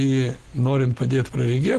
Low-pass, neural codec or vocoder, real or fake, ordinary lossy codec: 14.4 kHz; none; real; Opus, 16 kbps